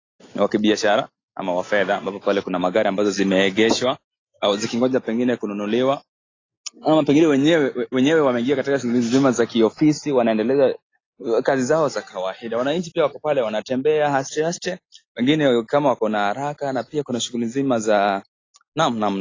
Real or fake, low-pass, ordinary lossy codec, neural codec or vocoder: real; 7.2 kHz; AAC, 32 kbps; none